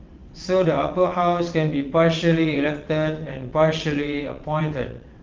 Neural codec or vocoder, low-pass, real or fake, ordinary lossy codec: vocoder, 22.05 kHz, 80 mel bands, WaveNeXt; 7.2 kHz; fake; Opus, 32 kbps